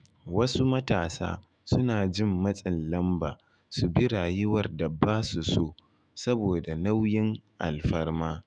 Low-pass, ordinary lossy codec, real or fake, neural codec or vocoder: 9.9 kHz; none; fake; autoencoder, 48 kHz, 128 numbers a frame, DAC-VAE, trained on Japanese speech